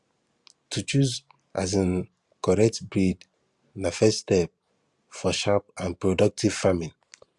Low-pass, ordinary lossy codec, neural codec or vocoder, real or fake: 10.8 kHz; Opus, 64 kbps; vocoder, 44.1 kHz, 128 mel bands every 512 samples, BigVGAN v2; fake